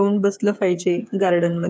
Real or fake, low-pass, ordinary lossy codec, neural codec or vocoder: fake; none; none; codec, 16 kHz, 8 kbps, FreqCodec, smaller model